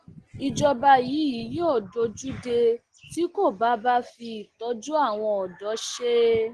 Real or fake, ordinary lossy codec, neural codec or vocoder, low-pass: real; Opus, 16 kbps; none; 9.9 kHz